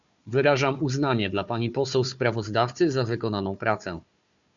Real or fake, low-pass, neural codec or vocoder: fake; 7.2 kHz; codec, 16 kHz, 4 kbps, FunCodec, trained on Chinese and English, 50 frames a second